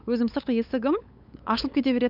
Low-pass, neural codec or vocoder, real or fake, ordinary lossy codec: 5.4 kHz; codec, 16 kHz, 8 kbps, FunCodec, trained on LibriTTS, 25 frames a second; fake; none